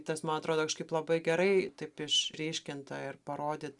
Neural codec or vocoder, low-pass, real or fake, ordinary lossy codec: none; 10.8 kHz; real; Opus, 64 kbps